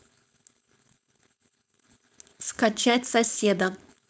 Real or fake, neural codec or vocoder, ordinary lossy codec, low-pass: fake; codec, 16 kHz, 4.8 kbps, FACodec; none; none